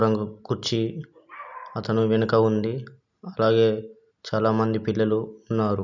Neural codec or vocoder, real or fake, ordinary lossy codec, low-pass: none; real; none; 7.2 kHz